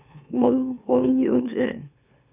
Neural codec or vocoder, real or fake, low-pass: autoencoder, 44.1 kHz, a latent of 192 numbers a frame, MeloTTS; fake; 3.6 kHz